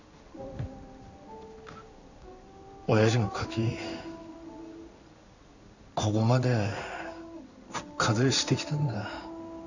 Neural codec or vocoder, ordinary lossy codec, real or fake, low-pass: codec, 16 kHz in and 24 kHz out, 1 kbps, XY-Tokenizer; none; fake; 7.2 kHz